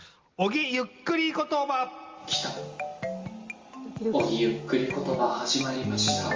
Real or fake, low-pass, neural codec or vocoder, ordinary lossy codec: real; 7.2 kHz; none; Opus, 32 kbps